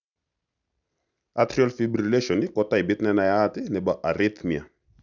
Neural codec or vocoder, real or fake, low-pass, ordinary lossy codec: none; real; 7.2 kHz; none